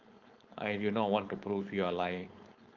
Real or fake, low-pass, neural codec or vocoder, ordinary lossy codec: fake; 7.2 kHz; codec, 16 kHz, 4.8 kbps, FACodec; Opus, 24 kbps